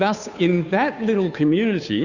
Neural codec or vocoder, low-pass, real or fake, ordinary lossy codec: codec, 16 kHz, 2 kbps, FunCodec, trained on Chinese and English, 25 frames a second; 7.2 kHz; fake; Opus, 64 kbps